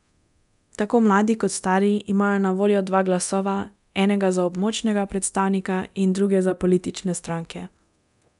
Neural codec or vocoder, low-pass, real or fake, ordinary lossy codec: codec, 24 kHz, 0.9 kbps, DualCodec; 10.8 kHz; fake; none